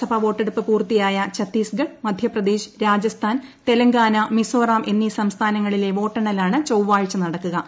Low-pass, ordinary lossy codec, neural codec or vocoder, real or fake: none; none; none; real